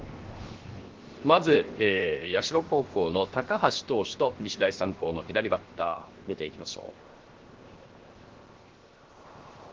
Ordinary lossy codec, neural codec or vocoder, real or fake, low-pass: Opus, 16 kbps; codec, 16 kHz, 0.7 kbps, FocalCodec; fake; 7.2 kHz